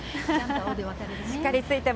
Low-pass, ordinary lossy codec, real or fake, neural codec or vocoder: none; none; real; none